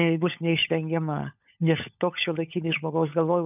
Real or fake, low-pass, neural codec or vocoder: fake; 3.6 kHz; codec, 16 kHz, 16 kbps, FunCodec, trained on LibriTTS, 50 frames a second